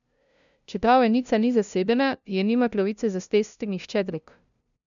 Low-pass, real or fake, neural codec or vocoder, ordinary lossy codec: 7.2 kHz; fake; codec, 16 kHz, 0.5 kbps, FunCodec, trained on LibriTTS, 25 frames a second; none